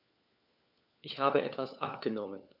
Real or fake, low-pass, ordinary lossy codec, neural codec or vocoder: fake; 5.4 kHz; none; codec, 16 kHz, 4 kbps, FunCodec, trained on LibriTTS, 50 frames a second